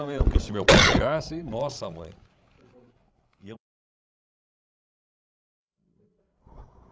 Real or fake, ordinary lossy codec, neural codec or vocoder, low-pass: fake; none; codec, 16 kHz, 16 kbps, FreqCodec, larger model; none